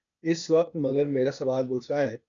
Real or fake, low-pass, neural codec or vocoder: fake; 7.2 kHz; codec, 16 kHz, 0.8 kbps, ZipCodec